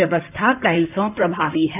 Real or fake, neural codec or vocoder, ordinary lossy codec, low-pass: fake; codec, 16 kHz in and 24 kHz out, 2.2 kbps, FireRedTTS-2 codec; none; 3.6 kHz